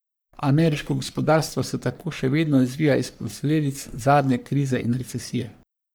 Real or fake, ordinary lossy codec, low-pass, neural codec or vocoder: fake; none; none; codec, 44.1 kHz, 3.4 kbps, Pupu-Codec